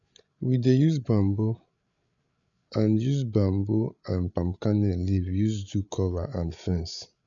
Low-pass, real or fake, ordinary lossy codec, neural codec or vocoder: 7.2 kHz; fake; none; codec, 16 kHz, 8 kbps, FreqCodec, larger model